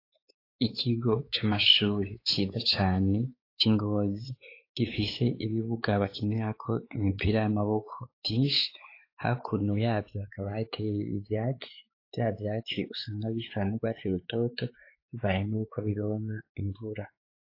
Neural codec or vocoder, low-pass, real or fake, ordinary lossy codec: codec, 16 kHz, 4 kbps, X-Codec, WavLM features, trained on Multilingual LibriSpeech; 5.4 kHz; fake; AAC, 32 kbps